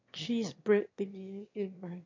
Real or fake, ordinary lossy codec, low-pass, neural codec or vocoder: fake; MP3, 48 kbps; 7.2 kHz; autoencoder, 22.05 kHz, a latent of 192 numbers a frame, VITS, trained on one speaker